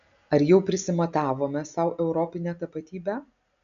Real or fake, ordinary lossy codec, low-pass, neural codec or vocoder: real; MP3, 64 kbps; 7.2 kHz; none